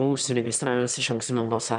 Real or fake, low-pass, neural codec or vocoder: fake; 9.9 kHz; autoencoder, 22.05 kHz, a latent of 192 numbers a frame, VITS, trained on one speaker